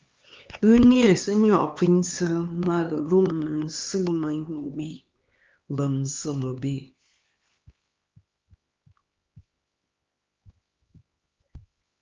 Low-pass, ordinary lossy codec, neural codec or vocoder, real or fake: 7.2 kHz; Opus, 24 kbps; codec, 16 kHz, 2 kbps, X-Codec, HuBERT features, trained on LibriSpeech; fake